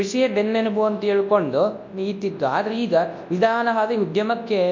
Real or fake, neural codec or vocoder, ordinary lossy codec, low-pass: fake; codec, 24 kHz, 0.9 kbps, WavTokenizer, large speech release; MP3, 48 kbps; 7.2 kHz